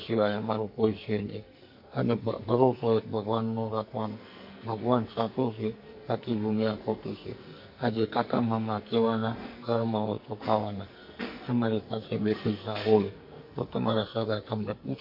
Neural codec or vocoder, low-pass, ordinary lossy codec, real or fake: codec, 44.1 kHz, 2.6 kbps, SNAC; 5.4 kHz; MP3, 32 kbps; fake